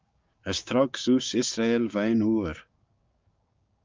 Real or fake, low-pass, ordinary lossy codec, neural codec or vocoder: fake; 7.2 kHz; Opus, 24 kbps; codec, 16 kHz, 6 kbps, DAC